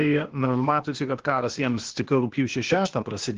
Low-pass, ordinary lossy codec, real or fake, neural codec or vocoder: 7.2 kHz; Opus, 16 kbps; fake; codec, 16 kHz, 0.8 kbps, ZipCodec